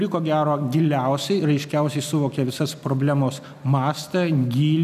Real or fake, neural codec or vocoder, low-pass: real; none; 14.4 kHz